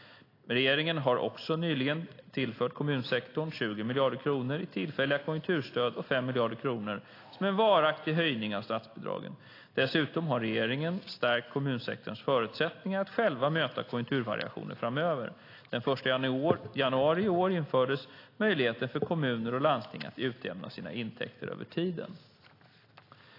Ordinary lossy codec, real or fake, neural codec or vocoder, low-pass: AAC, 32 kbps; real; none; 5.4 kHz